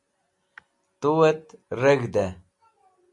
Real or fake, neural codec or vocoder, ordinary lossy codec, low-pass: real; none; MP3, 64 kbps; 10.8 kHz